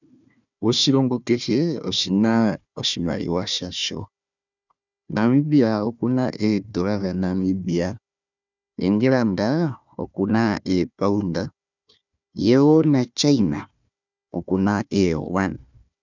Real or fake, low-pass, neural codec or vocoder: fake; 7.2 kHz; codec, 16 kHz, 1 kbps, FunCodec, trained on Chinese and English, 50 frames a second